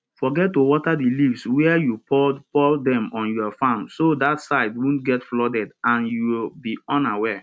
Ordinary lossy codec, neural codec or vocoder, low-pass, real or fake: none; none; none; real